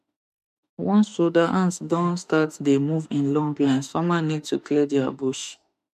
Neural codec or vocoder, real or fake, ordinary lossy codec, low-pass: autoencoder, 48 kHz, 32 numbers a frame, DAC-VAE, trained on Japanese speech; fake; MP3, 96 kbps; 14.4 kHz